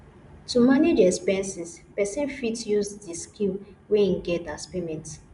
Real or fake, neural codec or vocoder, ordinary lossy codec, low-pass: real; none; none; 10.8 kHz